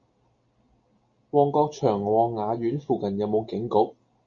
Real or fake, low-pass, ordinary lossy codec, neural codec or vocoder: real; 7.2 kHz; Opus, 64 kbps; none